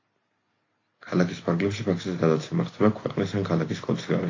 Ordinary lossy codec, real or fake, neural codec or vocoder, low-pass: AAC, 32 kbps; real; none; 7.2 kHz